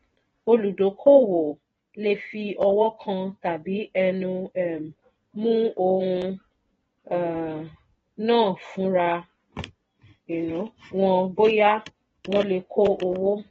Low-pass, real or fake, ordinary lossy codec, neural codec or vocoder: 10.8 kHz; fake; AAC, 24 kbps; vocoder, 24 kHz, 100 mel bands, Vocos